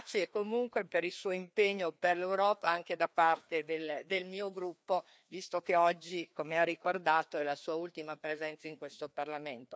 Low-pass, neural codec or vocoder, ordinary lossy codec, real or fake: none; codec, 16 kHz, 2 kbps, FreqCodec, larger model; none; fake